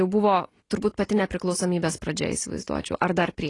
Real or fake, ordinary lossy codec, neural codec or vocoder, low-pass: real; AAC, 32 kbps; none; 10.8 kHz